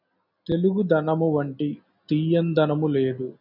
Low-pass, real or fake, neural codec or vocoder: 5.4 kHz; real; none